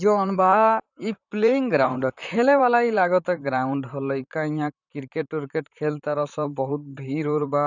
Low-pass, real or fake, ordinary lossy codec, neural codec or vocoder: 7.2 kHz; fake; none; vocoder, 44.1 kHz, 128 mel bands, Pupu-Vocoder